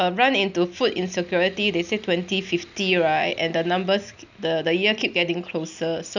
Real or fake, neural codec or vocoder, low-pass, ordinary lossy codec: real; none; 7.2 kHz; none